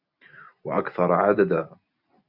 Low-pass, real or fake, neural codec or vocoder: 5.4 kHz; real; none